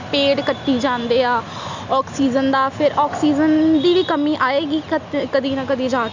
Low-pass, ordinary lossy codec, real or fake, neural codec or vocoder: 7.2 kHz; Opus, 64 kbps; real; none